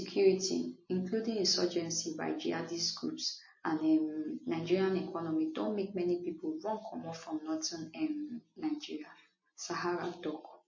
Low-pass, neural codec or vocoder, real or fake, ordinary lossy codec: 7.2 kHz; none; real; MP3, 32 kbps